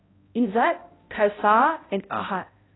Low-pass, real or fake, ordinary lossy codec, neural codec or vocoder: 7.2 kHz; fake; AAC, 16 kbps; codec, 16 kHz, 0.5 kbps, X-Codec, HuBERT features, trained on balanced general audio